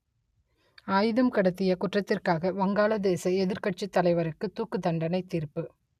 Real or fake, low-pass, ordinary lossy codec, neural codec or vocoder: real; 14.4 kHz; none; none